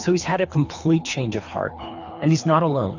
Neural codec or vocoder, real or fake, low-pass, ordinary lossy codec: codec, 24 kHz, 3 kbps, HILCodec; fake; 7.2 kHz; AAC, 48 kbps